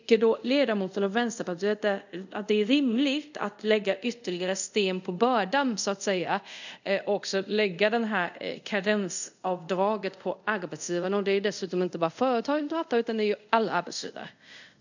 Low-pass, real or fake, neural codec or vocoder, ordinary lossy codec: 7.2 kHz; fake; codec, 24 kHz, 0.5 kbps, DualCodec; none